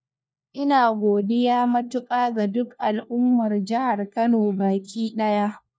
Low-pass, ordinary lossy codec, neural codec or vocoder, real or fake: none; none; codec, 16 kHz, 1 kbps, FunCodec, trained on LibriTTS, 50 frames a second; fake